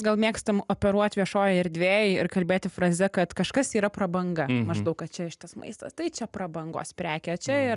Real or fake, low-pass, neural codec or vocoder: real; 10.8 kHz; none